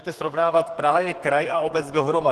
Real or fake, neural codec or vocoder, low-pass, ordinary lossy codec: fake; codec, 32 kHz, 1.9 kbps, SNAC; 14.4 kHz; Opus, 16 kbps